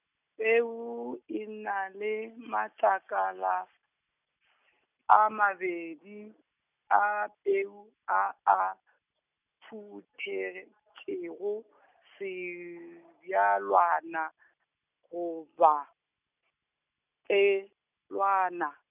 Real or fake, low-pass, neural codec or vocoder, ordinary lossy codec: real; 3.6 kHz; none; none